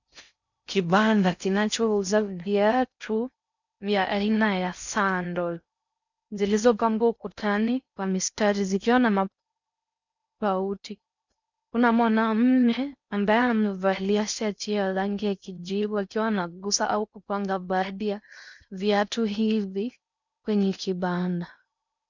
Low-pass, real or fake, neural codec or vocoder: 7.2 kHz; fake; codec, 16 kHz in and 24 kHz out, 0.6 kbps, FocalCodec, streaming, 4096 codes